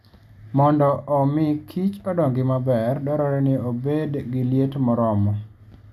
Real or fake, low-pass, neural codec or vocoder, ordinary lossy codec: real; 14.4 kHz; none; none